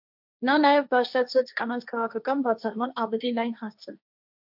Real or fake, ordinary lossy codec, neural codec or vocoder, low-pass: fake; MP3, 48 kbps; codec, 16 kHz, 1.1 kbps, Voila-Tokenizer; 5.4 kHz